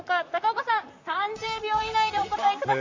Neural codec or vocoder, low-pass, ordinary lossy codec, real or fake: vocoder, 44.1 kHz, 128 mel bands, Pupu-Vocoder; 7.2 kHz; MP3, 64 kbps; fake